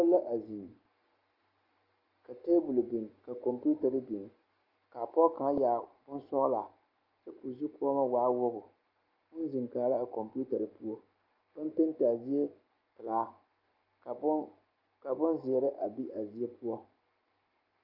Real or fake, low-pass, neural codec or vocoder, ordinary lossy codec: real; 5.4 kHz; none; Opus, 24 kbps